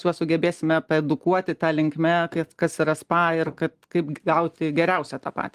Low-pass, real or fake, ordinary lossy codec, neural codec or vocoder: 14.4 kHz; real; Opus, 24 kbps; none